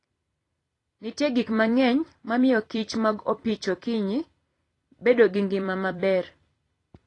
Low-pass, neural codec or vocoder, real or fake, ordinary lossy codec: 10.8 kHz; vocoder, 44.1 kHz, 128 mel bands every 256 samples, BigVGAN v2; fake; AAC, 32 kbps